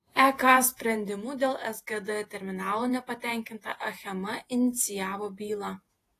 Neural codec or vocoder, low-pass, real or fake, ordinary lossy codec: vocoder, 48 kHz, 128 mel bands, Vocos; 14.4 kHz; fake; AAC, 48 kbps